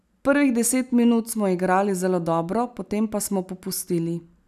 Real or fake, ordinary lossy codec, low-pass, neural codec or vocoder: real; none; 14.4 kHz; none